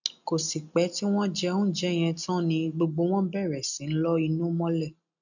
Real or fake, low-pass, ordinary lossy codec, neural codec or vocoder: real; 7.2 kHz; none; none